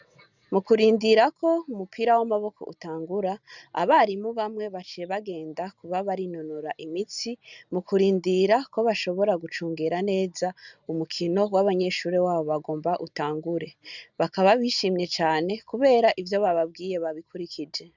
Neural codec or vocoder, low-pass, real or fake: none; 7.2 kHz; real